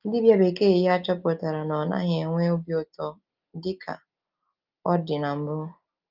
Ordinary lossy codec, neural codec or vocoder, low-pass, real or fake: Opus, 32 kbps; none; 5.4 kHz; real